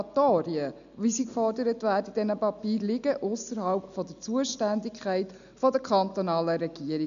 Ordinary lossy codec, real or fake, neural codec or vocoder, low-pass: none; real; none; 7.2 kHz